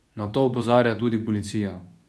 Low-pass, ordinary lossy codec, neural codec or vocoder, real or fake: none; none; codec, 24 kHz, 0.9 kbps, WavTokenizer, medium speech release version 2; fake